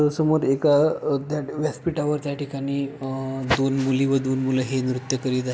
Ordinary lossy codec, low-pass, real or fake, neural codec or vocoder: none; none; real; none